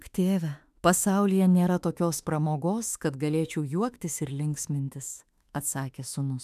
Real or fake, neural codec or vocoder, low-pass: fake; autoencoder, 48 kHz, 32 numbers a frame, DAC-VAE, trained on Japanese speech; 14.4 kHz